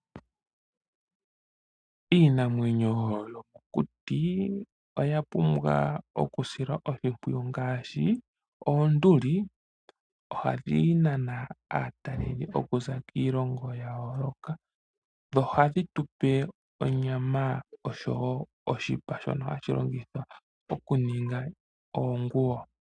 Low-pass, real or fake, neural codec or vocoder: 9.9 kHz; real; none